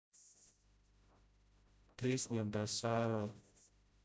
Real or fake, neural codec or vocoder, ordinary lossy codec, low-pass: fake; codec, 16 kHz, 0.5 kbps, FreqCodec, smaller model; none; none